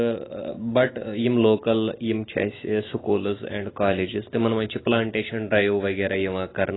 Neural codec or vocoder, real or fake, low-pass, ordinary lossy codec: none; real; 7.2 kHz; AAC, 16 kbps